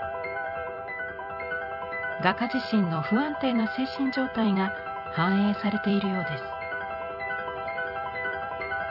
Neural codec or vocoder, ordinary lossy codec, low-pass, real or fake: vocoder, 44.1 kHz, 128 mel bands every 256 samples, BigVGAN v2; none; 5.4 kHz; fake